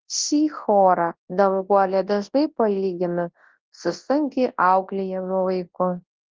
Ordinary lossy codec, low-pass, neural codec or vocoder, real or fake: Opus, 16 kbps; 7.2 kHz; codec, 24 kHz, 0.9 kbps, WavTokenizer, large speech release; fake